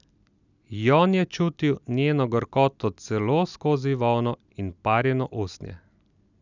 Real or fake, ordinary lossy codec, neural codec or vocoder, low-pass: real; none; none; 7.2 kHz